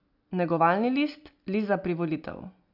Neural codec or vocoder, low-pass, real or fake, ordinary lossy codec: none; 5.4 kHz; real; none